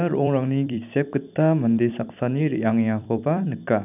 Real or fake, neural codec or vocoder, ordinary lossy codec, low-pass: fake; vocoder, 44.1 kHz, 128 mel bands every 256 samples, BigVGAN v2; none; 3.6 kHz